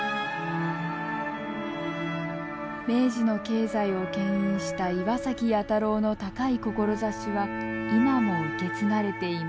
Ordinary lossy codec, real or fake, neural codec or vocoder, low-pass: none; real; none; none